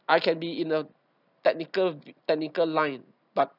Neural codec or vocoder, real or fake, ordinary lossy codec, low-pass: none; real; none; 5.4 kHz